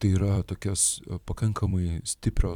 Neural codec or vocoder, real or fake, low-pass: vocoder, 44.1 kHz, 128 mel bands every 256 samples, BigVGAN v2; fake; 19.8 kHz